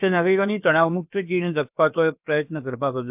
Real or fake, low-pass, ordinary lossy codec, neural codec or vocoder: fake; 3.6 kHz; AAC, 32 kbps; codec, 16 kHz, about 1 kbps, DyCAST, with the encoder's durations